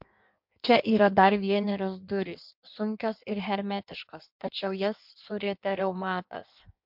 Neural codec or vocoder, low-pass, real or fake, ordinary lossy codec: codec, 16 kHz in and 24 kHz out, 1.1 kbps, FireRedTTS-2 codec; 5.4 kHz; fake; MP3, 48 kbps